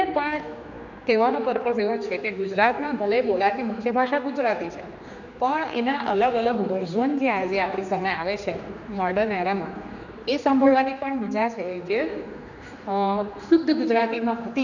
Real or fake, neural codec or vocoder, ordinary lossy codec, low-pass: fake; codec, 16 kHz, 2 kbps, X-Codec, HuBERT features, trained on general audio; none; 7.2 kHz